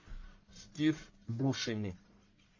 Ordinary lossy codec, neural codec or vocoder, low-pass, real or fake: MP3, 32 kbps; codec, 44.1 kHz, 1.7 kbps, Pupu-Codec; 7.2 kHz; fake